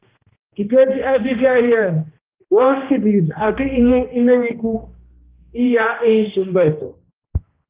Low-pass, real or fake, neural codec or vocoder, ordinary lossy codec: 3.6 kHz; fake; codec, 16 kHz, 1 kbps, X-Codec, HuBERT features, trained on general audio; Opus, 32 kbps